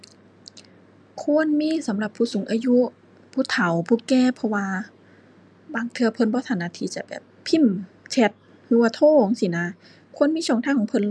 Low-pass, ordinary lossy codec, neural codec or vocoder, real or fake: none; none; none; real